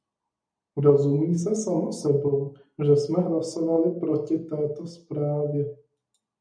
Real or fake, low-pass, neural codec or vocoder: real; 9.9 kHz; none